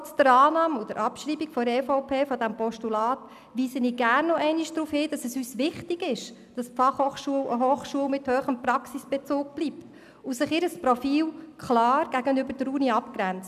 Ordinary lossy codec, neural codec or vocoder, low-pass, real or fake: none; none; 14.4 kHz; real